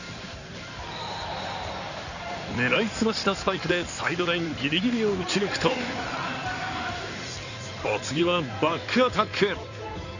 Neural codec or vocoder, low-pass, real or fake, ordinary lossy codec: codec, 16 kHz in and 24 kHz out, 2.2 kbps, FireRedTTS-2 codec; 7.2 kHz; fake; none